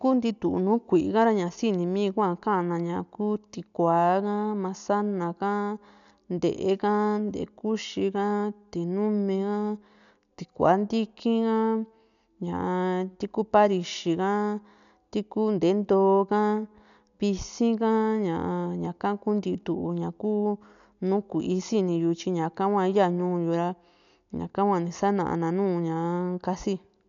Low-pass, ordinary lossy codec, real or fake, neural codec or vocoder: 7.2 kHz; none; real; none